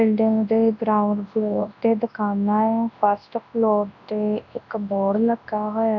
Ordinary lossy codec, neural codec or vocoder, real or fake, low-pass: none; codec, 24 kHz, 0.9 kbps, WavTokenizer, large speech release; fake; 7.2 kHz